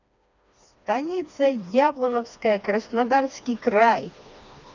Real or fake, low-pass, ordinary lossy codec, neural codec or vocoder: fake; 7.2 kHz; none; codec, 16 kHz, 2 kbps, FreqCodec, smaller model